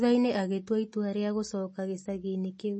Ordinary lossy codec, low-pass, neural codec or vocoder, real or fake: MP3, 32 kbps; 10.8 kHz; none; real